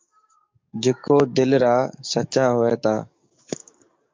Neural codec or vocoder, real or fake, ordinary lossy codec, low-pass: codec, 44.1 kHz, 7.8 kbps, DAC; fake; MP3, 64 kbps; 7.2 kHz